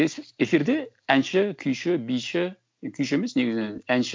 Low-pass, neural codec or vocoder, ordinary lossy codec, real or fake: none; none; none; real